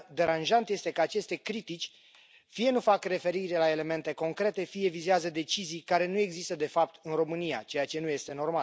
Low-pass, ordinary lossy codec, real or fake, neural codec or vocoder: none; none; real; none